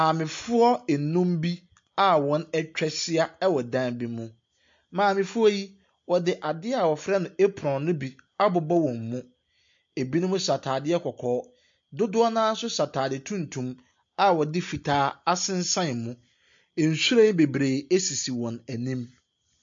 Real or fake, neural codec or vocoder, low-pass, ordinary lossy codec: real; none; 7.2 kHz; MP3, 48 kbps